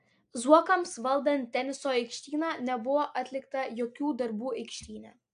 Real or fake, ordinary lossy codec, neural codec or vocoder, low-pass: real; AAC, 64 kbps; none; 9.9 kHz